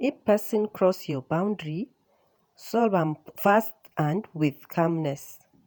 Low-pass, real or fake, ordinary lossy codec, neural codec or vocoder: none; fake; none; vocoder, 48 kHz, 128 mel bands, Vocos